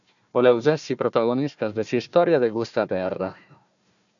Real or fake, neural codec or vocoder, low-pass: fake; codec, 16 kHz, 1 kbps, FunCodec, trained on Chinese and English, 50 frames a second; 7.2 kHz